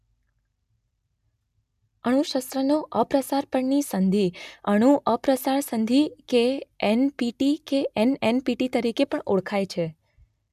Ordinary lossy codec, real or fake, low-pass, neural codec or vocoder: none; real; 14.4 kHz; none